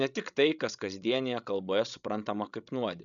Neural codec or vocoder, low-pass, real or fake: codec, 16 kHz, 16 kbps, FreqCodec, larger model; 7.2 kHz; fake